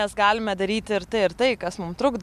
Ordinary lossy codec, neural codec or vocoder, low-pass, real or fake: MP3, 96 kbps; none; 14.4 kHz; real